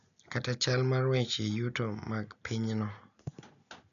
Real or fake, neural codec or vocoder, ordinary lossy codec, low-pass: real; none; none; 7.2 kHz